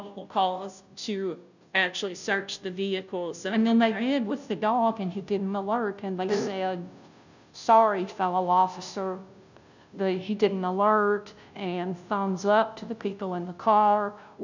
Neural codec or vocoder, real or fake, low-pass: codec, 16 kHz, 0.5 kbps, FunCodec, trained on Chinese and English, 25 frames a second; fake; 7.2 kHz